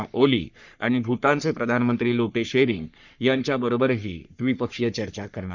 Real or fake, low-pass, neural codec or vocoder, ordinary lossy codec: fake; 7.2 kHz; codec, 44.1 kHz, 3.4 kbps, Pupu-Codec; none